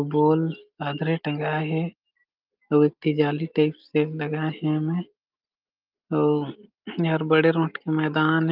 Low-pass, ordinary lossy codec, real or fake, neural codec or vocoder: 5.4 kHz; Opus, 32 kbps; real; none